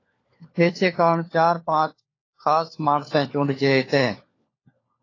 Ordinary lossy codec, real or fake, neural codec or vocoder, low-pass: AAC, 32 kbps; fake; codec, 16 kHz, 4 kbps, FunCodec, trained on LibriTTS, 50 frames a second; 7.2 kHz